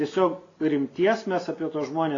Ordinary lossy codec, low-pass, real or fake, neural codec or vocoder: AAC, 32 kbps; 7.2 kHz; real; none